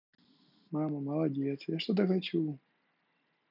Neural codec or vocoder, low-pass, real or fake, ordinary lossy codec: none; 5.4 kHz; real; none